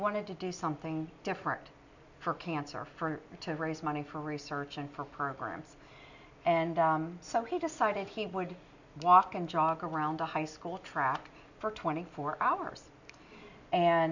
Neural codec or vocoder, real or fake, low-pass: none; real; 7.2 kHz